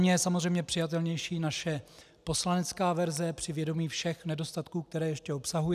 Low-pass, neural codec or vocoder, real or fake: 14.4 kHz; none; real